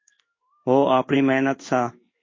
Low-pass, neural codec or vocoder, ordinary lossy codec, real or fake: 7.2 kHz; codec, 16 kHz in and 24 kHz out, 1 kbps, XY-Tokenizer; MP3, 32 kbps; fake